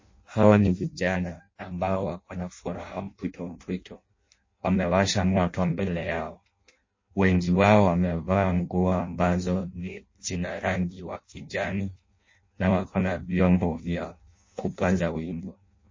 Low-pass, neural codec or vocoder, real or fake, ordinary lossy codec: 7.2 kHz; codec, 16 kHz in and 24 kHz out, 0.6 kbps, FireRedTTS-2 codec; fake; MP3, 32 kbps